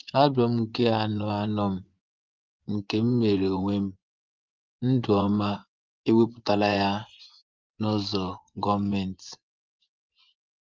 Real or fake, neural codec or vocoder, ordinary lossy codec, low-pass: fake; vocoder, 24 kHz, 100 mel bands, Vocos; Opus, 24 kbps; 7.2 kHz